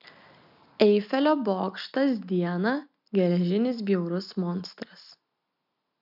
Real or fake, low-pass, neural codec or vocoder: real; 5.4 kHz; none